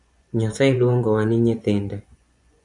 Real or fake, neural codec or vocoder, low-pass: fake; vocoder, 24 kHz, 100 mel bands, Vocos; 10.8 kHz